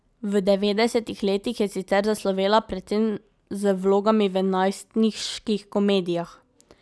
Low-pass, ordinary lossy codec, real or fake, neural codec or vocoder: none; none; real; none